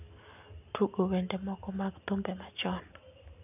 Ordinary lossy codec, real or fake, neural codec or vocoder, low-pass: none; real; none; 3.6 kHz